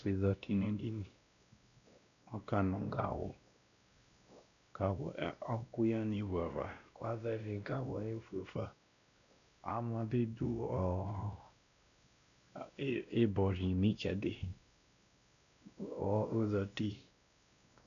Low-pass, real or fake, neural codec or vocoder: 7.2 kHz; fake; codec, 16 kHz, 1 kbps, X-Codec, WavLM features, trained on Multilingual LibriSpeech